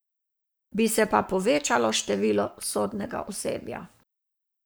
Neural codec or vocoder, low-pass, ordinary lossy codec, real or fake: codec, 44.1 kHz, 7.8 kbps, Pupu-Codec; none; none; fake